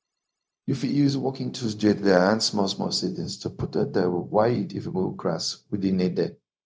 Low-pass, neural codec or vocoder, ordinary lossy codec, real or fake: none; codec, 16 kHz, 0.4 kbps, LongCat-Audio-Codec; none; fake